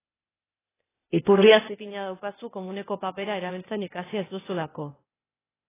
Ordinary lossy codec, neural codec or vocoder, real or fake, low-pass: AAC, 16 kbps; codec, 16 kHz, 0.8 kbps, ZipCodec; fake; 3.6 kHz